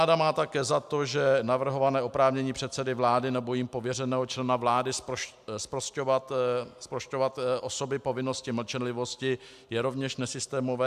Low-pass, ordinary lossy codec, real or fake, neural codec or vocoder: 14.4 kHz; AAC, 96 kbps; real; none